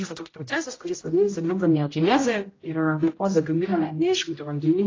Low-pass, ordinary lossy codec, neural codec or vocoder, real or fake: 7.2 kHz; AAC, 32 kbps; codec, 16 kHz, 0.5 kbps, X-Codec, HuBERT features, trained on general audio; fake